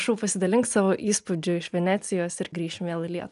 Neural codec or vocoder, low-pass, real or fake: none; 10.8 kHz; real